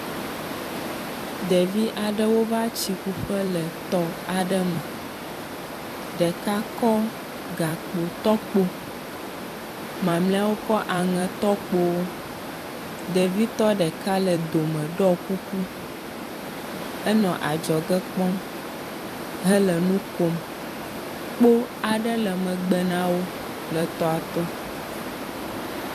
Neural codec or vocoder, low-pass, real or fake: vocoder, 44.1 kHz, 128 mel bands every 256 samples, BigVGAN v2; 14.4 kHz; fake